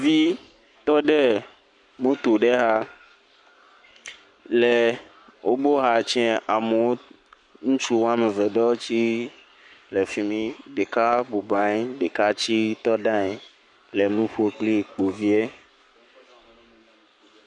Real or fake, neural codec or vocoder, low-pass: fake; codec, 44.1 kHz, 7.8 kbps, Pupu-Codec; 10.8 kHz